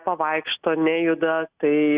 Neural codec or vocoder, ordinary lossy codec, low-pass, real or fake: none; Opus, 64 kbps; 3.6 kHz; real